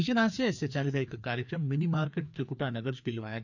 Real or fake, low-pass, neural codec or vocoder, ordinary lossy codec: fake; 7.2 kHz; codec, 16 kHz, 2 kbps, FreqCodec, larger model; none